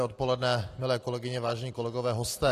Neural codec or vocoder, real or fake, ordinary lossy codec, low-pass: none; real; AAC, 48 kbps; 14.4 kHz